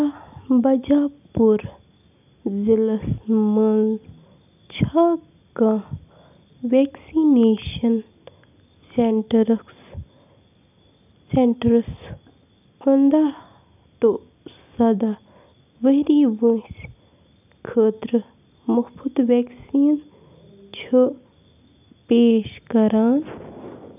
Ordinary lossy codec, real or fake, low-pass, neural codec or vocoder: none; real; 3.6 kHz; none